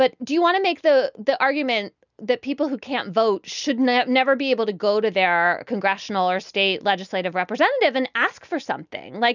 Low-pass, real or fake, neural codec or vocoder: 7.2 kHz; real; none